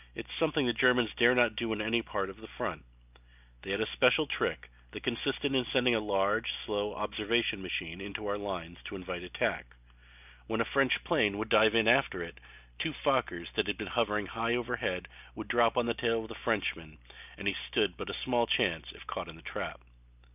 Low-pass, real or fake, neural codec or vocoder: 3.6 kHz; real; none